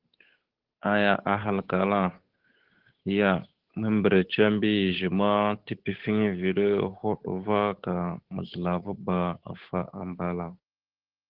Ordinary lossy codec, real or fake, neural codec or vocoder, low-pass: Opus, 16 kbps; fake; codec, 16 kHz, 8 kbps, FunCodec, trained on Chinese and English, 25 frames a second; 5.4 kHz